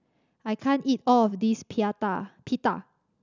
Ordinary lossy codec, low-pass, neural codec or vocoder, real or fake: none; 7.2 kHz; none; real